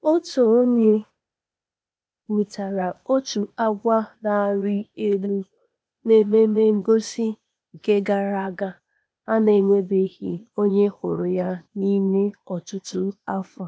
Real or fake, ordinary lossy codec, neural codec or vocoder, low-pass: fake; none; codec, 16 kHz, 0.8 kbps, ZipCodec; none